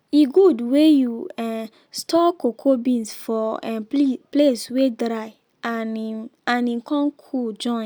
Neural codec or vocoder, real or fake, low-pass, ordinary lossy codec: none; real; none; none